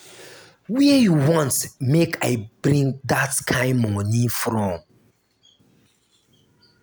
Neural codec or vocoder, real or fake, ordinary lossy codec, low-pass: none; real; none; none